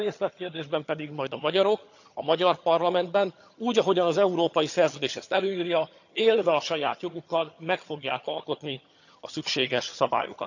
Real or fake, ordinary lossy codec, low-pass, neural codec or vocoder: fake; none; 7.2 kHz; vocoder, 22.05 kHz, 80 mel bands, HiFi-GAN